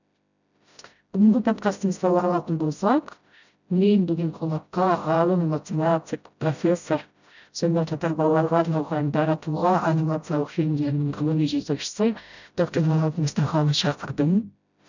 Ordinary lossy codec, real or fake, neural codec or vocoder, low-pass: none; fake; codec, 16 kHz, 0.5 kbps, FreqCodec, smaller model; 7.2 kHz